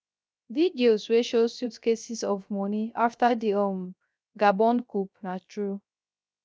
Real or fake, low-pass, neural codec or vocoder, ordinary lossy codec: fake; none; codec, 16 kHz, 0.3 kbps, FocalCodec; none